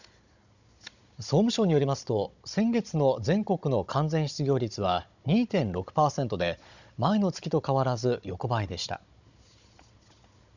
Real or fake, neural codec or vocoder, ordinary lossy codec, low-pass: fake; codec, 16 kHz, 16 kbps, FunCodec, trained on Chinese and English, 50 frames a second; none; 7.2 kHz